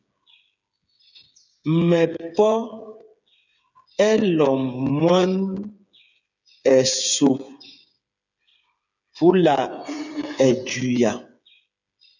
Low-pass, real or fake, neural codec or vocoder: 7.2 kHz; fake; codec, 16 kHz, 8 kbps, FreqCodec, smaller model